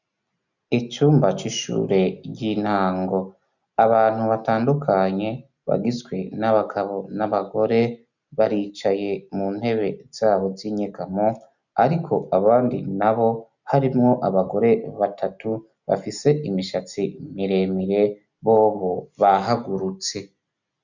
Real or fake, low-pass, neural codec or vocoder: real; 7.2 kHz; none